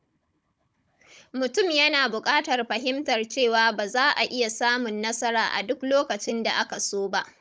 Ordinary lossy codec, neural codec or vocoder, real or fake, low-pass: none; codec, 16 kHz, 16 kbps, FunCodec, trained on Chinese and English, 50 frames a second; fake; none